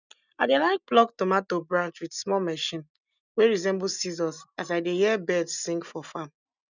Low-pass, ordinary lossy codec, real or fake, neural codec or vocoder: 7.2 kHz; none; real; none